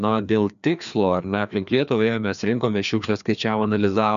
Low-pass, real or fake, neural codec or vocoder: 7.2 kHz; fake; codec, 16 kHz, 2 kbps, FreqCodec, larger model